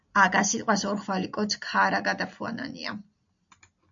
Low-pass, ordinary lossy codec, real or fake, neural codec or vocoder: 7.2 kHz; AAC, 64 kbps; real; none